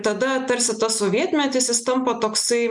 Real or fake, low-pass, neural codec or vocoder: real; 10.8 kHz; none